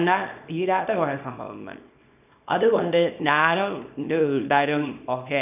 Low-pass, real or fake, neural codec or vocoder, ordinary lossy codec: 3.6 kHz; fake; codec, 24 kHz, 0.9 kbps, WavTokenizer, small release; none